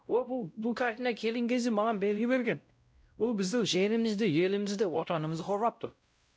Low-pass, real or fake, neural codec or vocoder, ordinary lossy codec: none; fake; codec, 16 kHz, 0.5 kbps, X-Codec, WavLM features, trained on Multilingual LibriSpeech; none